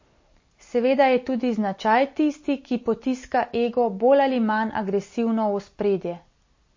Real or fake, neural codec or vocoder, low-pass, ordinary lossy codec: real; none; 7.2 kHz; MP3, 32 kbps